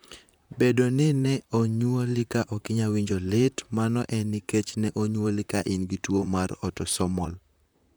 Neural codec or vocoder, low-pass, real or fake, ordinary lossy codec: vocoder, 44.1 kHz, 128 mel bands, Pupu-Vocoder; none; fake; none